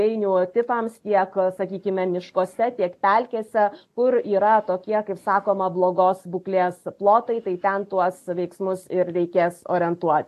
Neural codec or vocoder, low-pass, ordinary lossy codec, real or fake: none; 14.4 kHz; AAC, 64 kbps; real